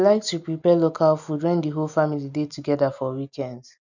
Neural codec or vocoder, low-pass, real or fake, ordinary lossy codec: none; 7.2 kHz; real; none